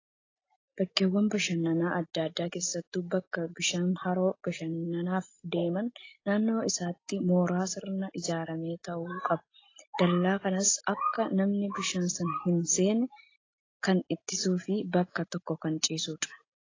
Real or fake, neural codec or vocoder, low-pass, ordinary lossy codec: real; none; 7.2 kHz; AAC, 32 kbps